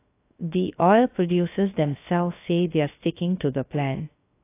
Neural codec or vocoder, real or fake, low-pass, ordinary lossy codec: codec, 16 kHz, 0.3 kbps, FocalCodec; fake; 3.6 kHz; AAC, 24 kbps